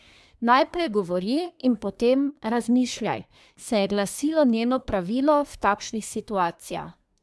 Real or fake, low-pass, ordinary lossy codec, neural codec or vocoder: fake; none; none; codec, 24 kHz, 1 kbps, SNAC